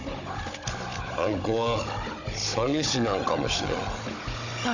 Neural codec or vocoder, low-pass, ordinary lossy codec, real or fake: codec, 16 kHz, 16 kbps, FunCodec, trained on Chinese and English, 50 frames a second; 7.2 kHz; none; fake